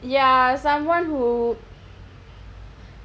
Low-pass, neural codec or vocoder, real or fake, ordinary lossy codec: none; none; real; none